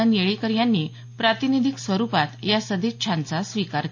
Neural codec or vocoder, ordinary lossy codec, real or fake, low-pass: none; AAC, 48 kbps; real; 7.2 kHz